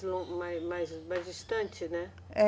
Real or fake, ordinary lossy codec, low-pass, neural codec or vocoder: real; none; none; none